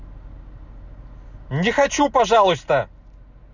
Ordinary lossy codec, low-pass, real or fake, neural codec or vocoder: none; 7.2 kHz; real; none